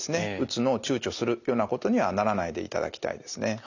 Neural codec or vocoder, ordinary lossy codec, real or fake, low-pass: none; none; real; 7.2 kHz